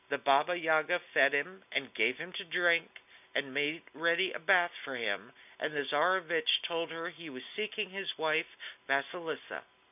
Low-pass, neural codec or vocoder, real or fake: 3.6 kHz; none; real